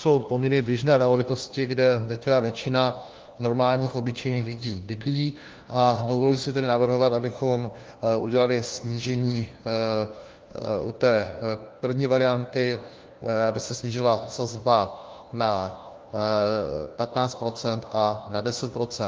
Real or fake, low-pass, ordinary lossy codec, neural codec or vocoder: fake; 7.2 kHz; Opus, 32 kbps; codec, 16 kHz, 1 kbps, FunCodec, trained on LibriTTS, 50 frames a second